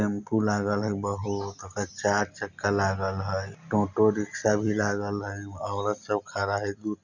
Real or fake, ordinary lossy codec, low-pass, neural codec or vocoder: real; none; 7.2 kHz; none